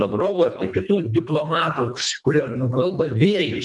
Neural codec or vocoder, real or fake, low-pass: codec, 24 kHz, 1.5 kbps, HILCodec; fake; 10.8 kHz